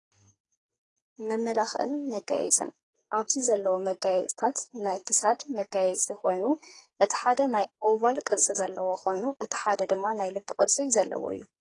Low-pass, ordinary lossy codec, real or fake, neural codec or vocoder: 10.8 kHz; AAC, 32 kbps; fake; codec, 44.1 kHz, 2.6 kbps, SNAC